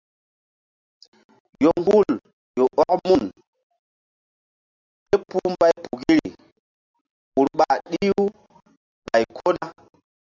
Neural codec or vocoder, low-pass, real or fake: none; 7.2 kHz; real